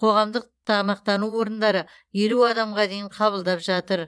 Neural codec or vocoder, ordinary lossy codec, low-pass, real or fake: vocoder, 22.05 kHz, 80 mel bands, Vocos; none; none; fake